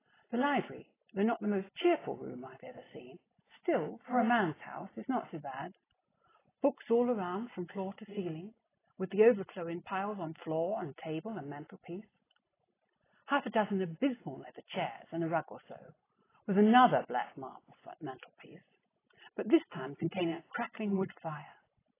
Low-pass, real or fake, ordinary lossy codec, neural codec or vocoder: 3.6 kHz; real; AAC, 16 kbps; none